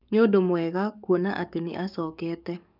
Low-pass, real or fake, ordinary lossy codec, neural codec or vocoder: 5.4 kHz; fake; none; codec, 44.1 kHz, 7.8 kbps, DAC